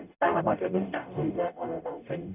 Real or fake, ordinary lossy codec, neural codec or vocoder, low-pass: fake; none; codec, 44.1 kHz, 0.9 kbps, DAC; 3.6 kHz